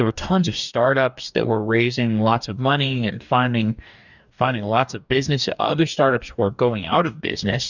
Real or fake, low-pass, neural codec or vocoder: fake; 7.2 kHz; codec, 44.1 kHz, 2.6 kbps, DAC